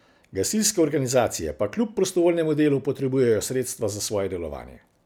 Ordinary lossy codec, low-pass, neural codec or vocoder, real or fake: none; none; none; real